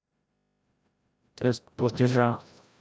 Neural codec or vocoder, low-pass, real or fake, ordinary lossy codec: codec, 16 kHz, 0.5 kbps, FreqCodec, larger model; none; fake; none